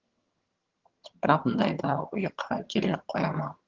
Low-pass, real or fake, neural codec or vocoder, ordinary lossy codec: 7.2 kHz; fake; vocoder, 22.05 kHz, 80 mel bands, HiFi-GAN; Opus, 16 kbps